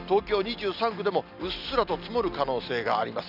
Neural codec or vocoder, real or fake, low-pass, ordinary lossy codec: none; real; 5.4 kHz; none